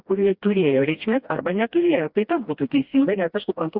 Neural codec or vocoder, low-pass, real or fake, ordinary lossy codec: codec, 16 kHz, 1 kbps, FreqCodec, smaller model; 5.4 kHz; fake; Opus, 64 kbps